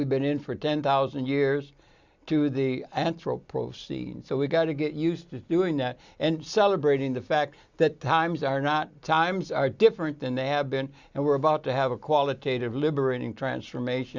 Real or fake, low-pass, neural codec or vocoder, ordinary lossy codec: real; 7.2 kHz; none; Opus, 64 kbps